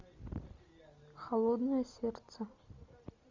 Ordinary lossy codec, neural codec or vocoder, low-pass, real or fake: MP3, 64 kbps; none; 7.2 kHz; real